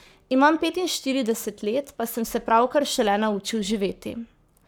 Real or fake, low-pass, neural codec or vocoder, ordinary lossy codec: fake; none; codec, 44.1 kHz, 7.8 kbps, Pupu-Codec; none